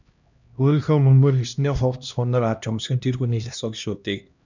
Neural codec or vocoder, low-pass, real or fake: codec, 16 kHz, 1 kbps, X-Codec, HuBERT features, trained on LibriSpeech; 7.2 kHz; fake